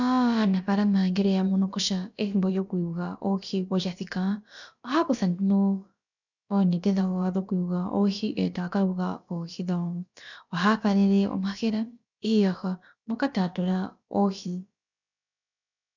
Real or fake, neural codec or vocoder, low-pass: fake; codec, 16 kHz, about 1 kbps, DyCAST, with the encoder's durations; 7.2 kHz